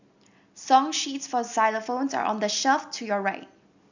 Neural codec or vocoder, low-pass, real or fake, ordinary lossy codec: none; 7.2 kHz; real; none